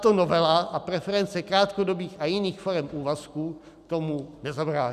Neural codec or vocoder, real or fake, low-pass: none; real; 14.4 kHz